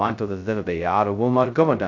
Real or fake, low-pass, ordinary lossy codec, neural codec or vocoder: fake; 7.2 kHz; AAC, 48 kbps; codec, 16 kHz, 0.2 kbps, FocalCodec